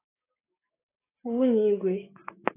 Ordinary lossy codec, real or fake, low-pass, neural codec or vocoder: AAC, 24 kbps; fake; 3.6 kHz; codec, 16 kHz, 6 kbps, DAC